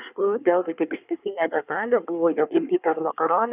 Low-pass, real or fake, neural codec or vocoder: 3.6 kHz; fake; codec, 24 kHz, 1 kbps, SNAC